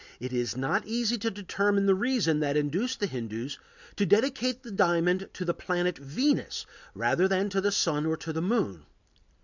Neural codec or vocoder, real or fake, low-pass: none; real; 7.2 kHz